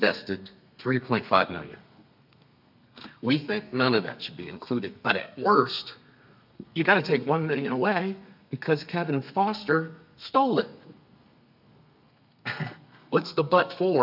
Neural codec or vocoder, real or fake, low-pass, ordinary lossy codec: codec, 32 kHz, 1.9 kbps, SNAC; fake; 5.4 kHz; MP3, 48 kbps